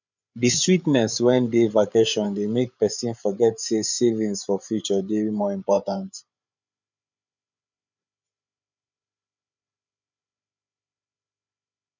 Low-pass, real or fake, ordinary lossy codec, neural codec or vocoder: 7.2 kHz; fake; none; codec, 16 kHz, 8 kbps, FreqCodec, larger model